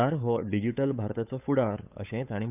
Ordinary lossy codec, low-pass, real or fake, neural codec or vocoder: none; 3.6 kHz; fake; codec, 16 kHz, 8 kbps, FunCodec, trained on Chinese and English, 25 frames a second